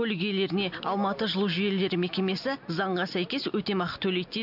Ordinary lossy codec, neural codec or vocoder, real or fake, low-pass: none; none; real; 5.4 kHz